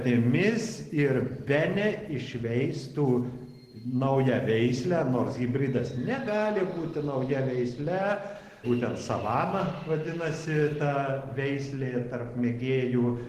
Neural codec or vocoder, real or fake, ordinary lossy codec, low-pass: none; real; Opus, 16 kbps; 14.4 kHz